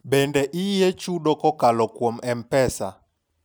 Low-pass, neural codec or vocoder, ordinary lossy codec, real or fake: none; none; none; real